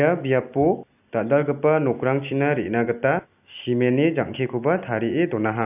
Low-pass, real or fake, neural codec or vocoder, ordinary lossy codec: 3.6 kHz; real; none; AAC, 32 kbps